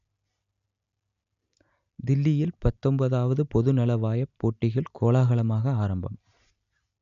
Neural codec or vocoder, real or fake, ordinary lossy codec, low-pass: none; real; none; 7.2 kHz